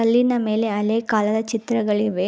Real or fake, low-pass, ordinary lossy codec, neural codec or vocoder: real; none; none; none